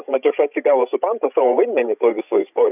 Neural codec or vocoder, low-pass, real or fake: codec, 16 kHz, 16 kbps, FreqCodec, larger model; 3.6 kHz; fake